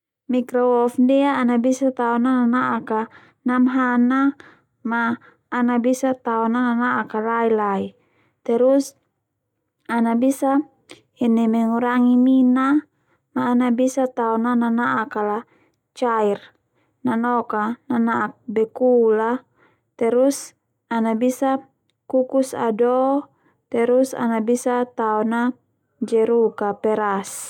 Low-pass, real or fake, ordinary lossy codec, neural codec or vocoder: 19.8 kHz; real; none; none